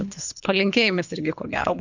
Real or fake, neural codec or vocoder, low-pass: fake; codec, 24 kHz, 3 kbps, HILCodec; 7.2 kHz